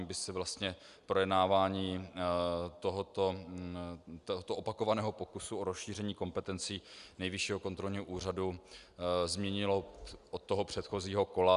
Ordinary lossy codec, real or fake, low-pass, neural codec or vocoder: Opus, 64 kbps; real; 10.8 kHz; none